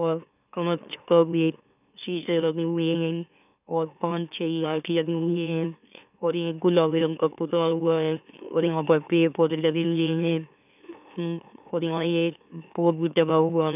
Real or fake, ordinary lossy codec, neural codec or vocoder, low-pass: fake; none; autoencoder, 44.1 kHz, a latent of 192 numbers a frame, MeloTTS; 3.6 kHz